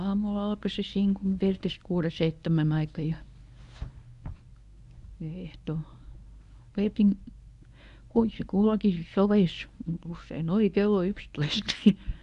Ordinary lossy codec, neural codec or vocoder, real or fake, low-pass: none; codec, 24 kHz, 0.9 kbps, WavTokenizer, medium speech release version 1; fake; 10.8 kHz